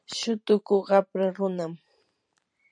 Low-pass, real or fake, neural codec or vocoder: 9.9 kHz; fake; vocoder, 44.1 kHz, 128 mel bands every 512 samples, BigVGAN v2